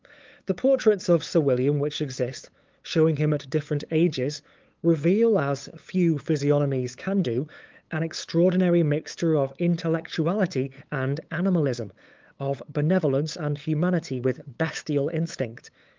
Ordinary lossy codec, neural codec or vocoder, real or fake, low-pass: Opus, 24 kbps; codec, 16 kHz, 8 kbps, FunCodec, trained on LibriTTS, 25 frames a second; fake; 7.2 kHz